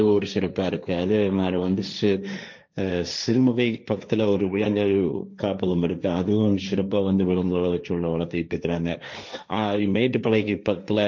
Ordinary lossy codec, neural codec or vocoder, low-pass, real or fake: MP3, 64 kbps; codec, 16 kHz, 1.1 kbps, Voila-Tokenizer; 7.2 kHz; fake